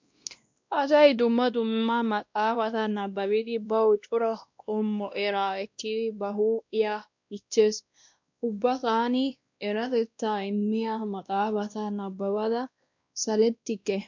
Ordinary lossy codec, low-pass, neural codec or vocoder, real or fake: MP3, 64 kbps; 7.2 kHz; codec, 16 kHz, 1 kbps, X-Codec, WavLM features, trained on Multilingual LibriSpeech; fake